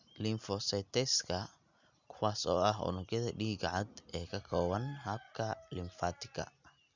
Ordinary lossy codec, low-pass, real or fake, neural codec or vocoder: none; 7.2 kHz; real; none